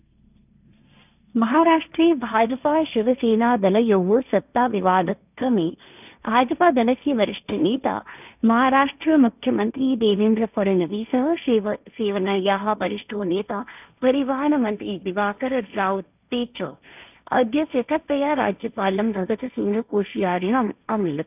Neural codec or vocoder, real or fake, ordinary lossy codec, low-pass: codec, 16 kHz, 1.1 kbps, Voila-Tokenizer; fake; none; 3.6 kHz